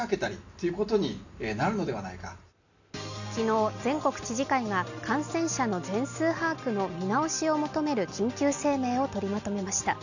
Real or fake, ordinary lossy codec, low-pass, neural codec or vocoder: real; none; 7.2 kHz; none